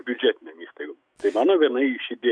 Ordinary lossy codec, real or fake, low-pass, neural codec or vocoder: MP3, 96 kbps; fake; 9.9 kHz; autoencoder, 48 kHz, 128 numbers a frame, DAC-VAE, trained on Japanese speech